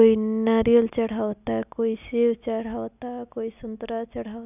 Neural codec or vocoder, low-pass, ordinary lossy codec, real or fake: none; 3.6 kHz; none; real